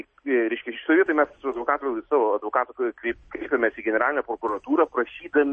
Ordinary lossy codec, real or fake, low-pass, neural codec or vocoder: MP3, 32 kbps; real; 10.8 kHz; none